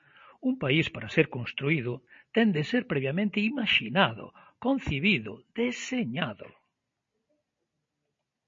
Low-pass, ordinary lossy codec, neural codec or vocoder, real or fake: 7.2 kHz; MP3, 32 kbps; none; real